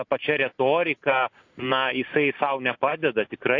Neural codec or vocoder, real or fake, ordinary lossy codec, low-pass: none; real; AAC, 48 kbps; 7.2 kHz